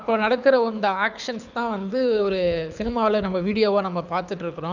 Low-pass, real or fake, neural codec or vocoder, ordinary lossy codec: 7.2 kHz; fake; codec, 24 kHz, 6 kbps, HILCodec; none